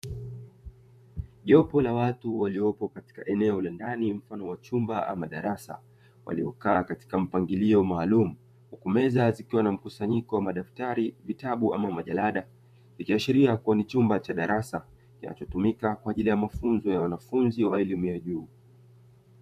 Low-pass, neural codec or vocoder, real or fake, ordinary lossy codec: 14.4 kHz; vocoder, 44.1 kHz, 128 mel bands, Pupu-Vocoder; fake; MP3, 96 kbps